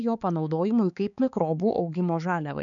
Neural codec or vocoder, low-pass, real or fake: codec, 16 kHz, 4 kbps, X-Codec, HuBERT features, trained on balanced general audio; 7.2 kHz; fake